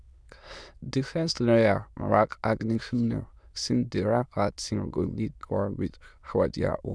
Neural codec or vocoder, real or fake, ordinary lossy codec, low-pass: autoencoder, 22.05 kHz, a latent of 192 numbers a frame, VITS, trained on many speakers; fake; none; none